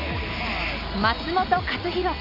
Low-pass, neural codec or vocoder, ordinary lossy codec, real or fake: 5.4 kHz; none; none; real